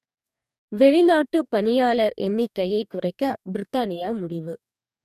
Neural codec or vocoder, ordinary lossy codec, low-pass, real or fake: codec, 44.1 kHz, 2.6 kbps, DAC; none; 14.4 kHz; fake